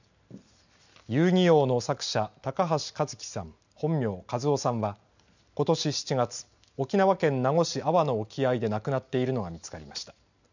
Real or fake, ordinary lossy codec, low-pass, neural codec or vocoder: real; none; 7.2 kHz; none